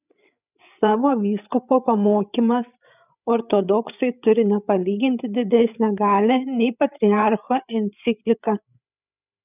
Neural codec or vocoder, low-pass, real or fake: codec, 16 kHz, 8 kbps, FreqCodec, larger model; 3.6 kHz; fake